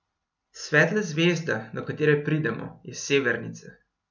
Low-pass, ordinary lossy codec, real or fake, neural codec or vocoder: 7.2 kHz; none; real; none